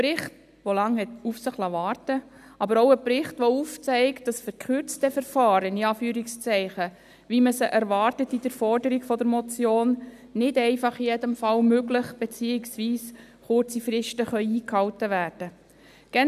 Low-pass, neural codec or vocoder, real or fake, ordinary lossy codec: 14.4 kHz; none; real; none